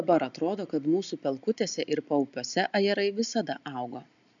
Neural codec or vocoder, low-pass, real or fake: none; 7.2 kHz; real